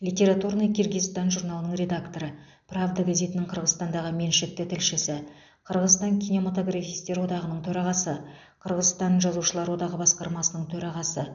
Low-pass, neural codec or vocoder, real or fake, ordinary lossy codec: 7.2 kHz; none; real; none